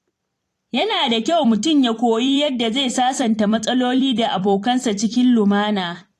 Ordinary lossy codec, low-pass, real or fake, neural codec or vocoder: AAC, 48 kbps; 14.4 kHz; fake; vocoder, 44.1 kHz, 128 mel bands every 256 samples, BigVGAN v2